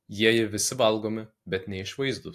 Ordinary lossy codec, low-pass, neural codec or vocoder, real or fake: AAC, 64 kbps; 14.4 kHz; none; real